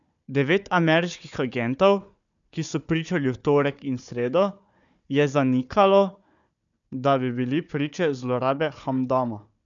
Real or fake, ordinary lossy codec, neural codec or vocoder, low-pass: fake; MP3, 96 kbps; codec, 16 kHz, 4 kbps, FunCodec, trained on Chinese and English, 50 frames a second; 7.2 kHz